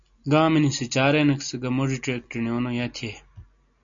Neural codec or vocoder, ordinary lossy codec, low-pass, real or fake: none; MP3, 64 kbps; 7.2 kHz; real